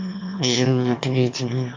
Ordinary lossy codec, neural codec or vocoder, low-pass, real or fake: MP3, 48 kbps; autoencoder, 22.05 kHz, a latent of 192 numbers a frame, VITS, trained on one speaker; 7.2 kHz; fake